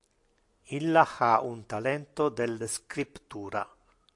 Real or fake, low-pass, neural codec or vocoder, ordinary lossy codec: real; 10.8 kHz; none; AAC, 64 kbps